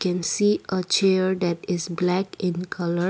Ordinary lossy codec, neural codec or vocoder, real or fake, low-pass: none; none; real; none